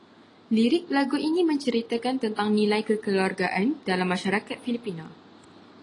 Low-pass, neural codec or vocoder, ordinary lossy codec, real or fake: 10.8 kHz; none; AAC, 32 kbps; real